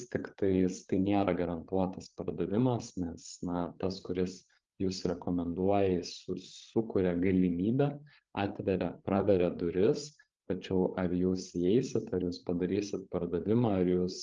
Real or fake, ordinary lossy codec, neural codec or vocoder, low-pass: fake; Opus, 32 kbps; codec, 16 kHz, 8 kbps, FreqCodec, larger model; 7.2 kHz